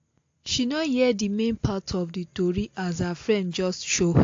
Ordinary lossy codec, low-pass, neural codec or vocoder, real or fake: AAC, 48 kbps; 7.2 kHz; none; real